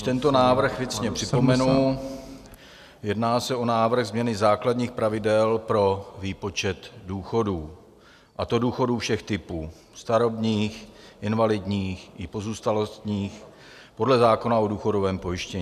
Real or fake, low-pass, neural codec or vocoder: real; 14.4 kHz; none